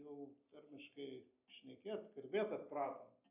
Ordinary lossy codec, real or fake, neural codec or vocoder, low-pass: AAC, 32 kbps; real; none; 3.6 kHz